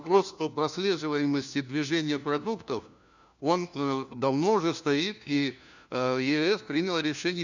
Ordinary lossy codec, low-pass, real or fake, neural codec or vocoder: none; 7.2 kHz; fake; codec, 16 kHz, 1 kbps, FunCodec, trained on LibriTTS, 50 frames a second